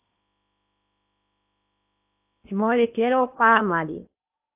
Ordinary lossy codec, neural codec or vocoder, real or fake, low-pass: AAC, 32 kbps; codec, 16 kHz in and 24 kHz out, 0.8 kbps, FocalCodec, streaming, 65536 codes; fake; 3.6 kHz